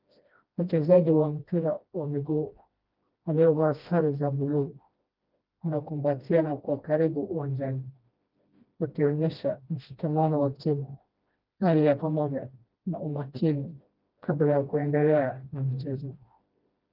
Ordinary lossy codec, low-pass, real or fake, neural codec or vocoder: Opus, 32 kbps; 5.4 kHz; fake; codec, 16 kHz, 1 kbps, FreqCodec, smaller model